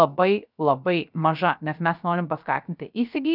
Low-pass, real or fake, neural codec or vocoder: 5.4 kHz; fake; codec, 16 kHz, 0.3 kbps, FocalCodec